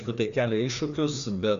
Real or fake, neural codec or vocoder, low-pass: fake; codec, 16 kHz, 2 kbps, FreqCodec, larger model; 7.2 kHz